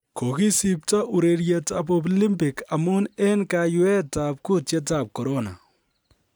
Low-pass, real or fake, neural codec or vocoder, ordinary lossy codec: none; real; none; none